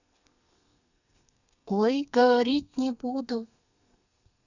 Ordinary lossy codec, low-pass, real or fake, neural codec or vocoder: none; 7.2 kHz; fake; codec, 32 kHz, 1.9 kbps, SNAC